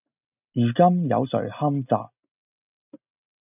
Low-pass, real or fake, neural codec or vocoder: 3.6 kHz; real; none